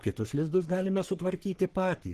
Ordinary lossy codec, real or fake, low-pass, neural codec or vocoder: Opus, 16 kbps; fake; 14.4 kHz; codec, 44.1 kHz, 3.4 kbps, Pupu-Codec